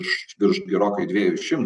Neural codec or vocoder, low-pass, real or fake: none; 10.8 kHz; real